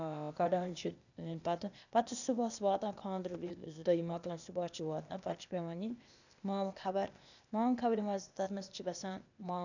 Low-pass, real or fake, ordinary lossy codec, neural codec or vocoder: 7.2 kHz; fake; none; codec, 16 kHz, 0.8 kbps, ZipCodec